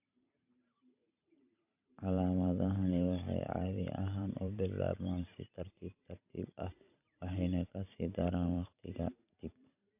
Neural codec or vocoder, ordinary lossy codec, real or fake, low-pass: vocoder, 44.1 kHz, 128 mel bands every 512 samples, BigVGAN v2; AAC, 32 kbps; fake; 3.6 kHz